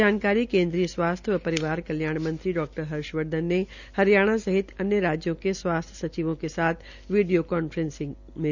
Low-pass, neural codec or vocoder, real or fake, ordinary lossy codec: 7.2 kHz; none; real; none